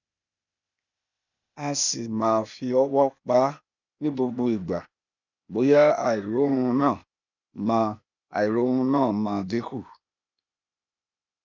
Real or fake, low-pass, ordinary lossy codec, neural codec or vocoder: fake; 7.2 kHz; none; codec, 16 kHz, 0.8 kbps, ZipCodec